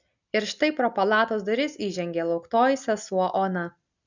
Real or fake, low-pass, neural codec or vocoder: real; 7.2 kHz; none